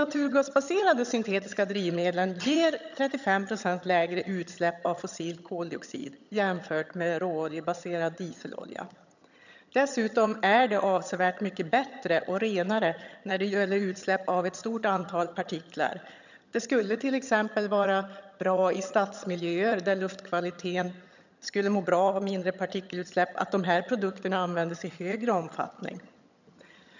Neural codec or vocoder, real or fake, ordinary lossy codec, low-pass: vocoder, 22.05 kHz, 80 mel bands, HiFi-GAN; fake; none; 7.2 kHz